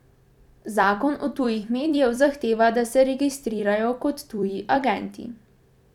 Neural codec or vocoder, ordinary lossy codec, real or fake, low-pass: vocoder, 44.1 kHz, 128 mel bands every 256 samples, BigVGAN v2; none; fake; 19.8 kHz